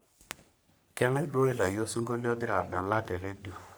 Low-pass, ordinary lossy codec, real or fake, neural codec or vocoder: none; none; fake; codec, 44.1 kHz, 3.4 kbps, Pupu-Codec